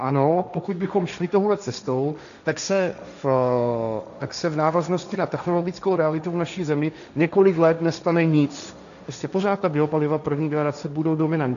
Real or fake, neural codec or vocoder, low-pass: fake; codec, 16 kHz, 1.1 kbps, Voila-Tokenizer; 7.2 kHz